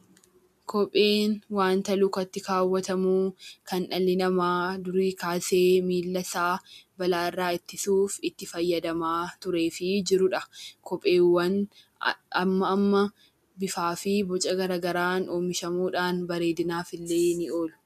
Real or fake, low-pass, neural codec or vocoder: real; 14.4 kHz; none